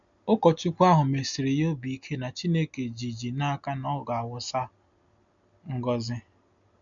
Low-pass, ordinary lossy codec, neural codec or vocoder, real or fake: 7.2 kHz; none; none; real